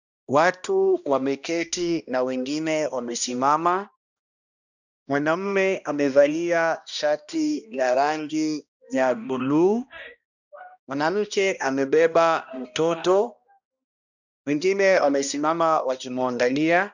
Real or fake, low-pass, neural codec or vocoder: fake; 7.2 kHz; codec, 16 kHz, 1 kbps, X-Codec, HuBERT features, trained on balanced general audio